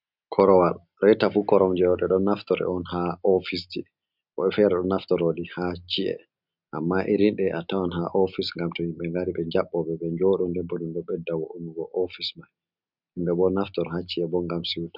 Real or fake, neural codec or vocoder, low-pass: real; none; 5.4 kHz